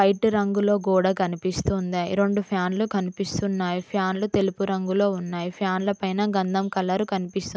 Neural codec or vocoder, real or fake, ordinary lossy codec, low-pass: none; real; none; none